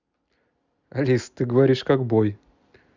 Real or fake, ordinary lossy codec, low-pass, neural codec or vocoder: real; Opus, 64 kbps; 7.2 kHz; none